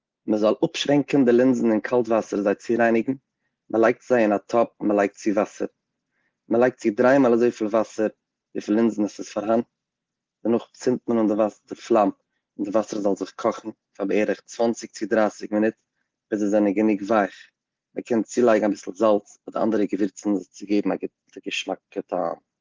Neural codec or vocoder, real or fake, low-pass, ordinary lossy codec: none; real; 7.2 kHz; Opus, 16 kbps